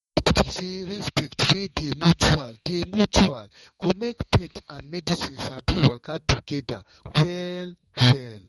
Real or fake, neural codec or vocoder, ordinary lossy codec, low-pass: fake; codec, 32 kHz, 1.9 kbps, SNAC; MP3, 48 kbps; 14.4 kHz